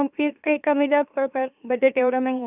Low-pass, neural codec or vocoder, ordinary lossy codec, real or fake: 3.6 kHz; autoencoder, 44.1 kHz, a latent of 192 numbers a frame, MeloTTS; none; fake